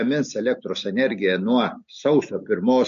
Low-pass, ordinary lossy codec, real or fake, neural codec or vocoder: 7.2 kHz; MP3, 48 kbps; real; none